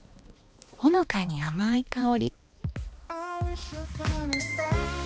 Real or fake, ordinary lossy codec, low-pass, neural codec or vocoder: fake; none; none; codec, 16 kHz, 2 kbps, X-Codec, HuBERT features, trained on balanced general audio